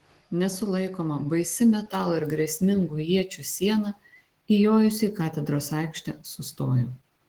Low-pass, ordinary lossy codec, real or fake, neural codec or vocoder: 19.8 kHz; Opus, 16 kbps; fake; autoencoder, 48 kHz, 128 numbers a frame, DAC-VAE, trained on Japanese speech